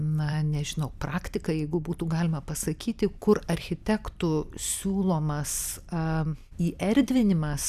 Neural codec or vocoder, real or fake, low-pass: vocoder, 44.1 kHz, 128 mel bands every 256 samples, BigVGAN v2; fake; 14.4 kHz